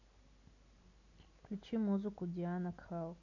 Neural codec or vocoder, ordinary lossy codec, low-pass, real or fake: none; AAC, 48 kbps; 7.2 kHz; real